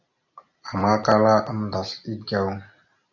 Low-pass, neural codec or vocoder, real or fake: 7.2 kHz; none; real